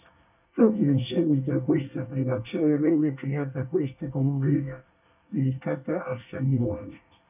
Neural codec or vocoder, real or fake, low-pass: codec, 24 kHz, 1 kbps, SNAC; fake; 3.6 kHz